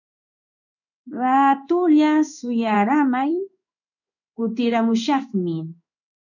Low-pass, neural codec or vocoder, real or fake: 7.2 kHz; codec, 16 kHz in and 24 kHz out, 1 kbps, XY-Tokenizer; fake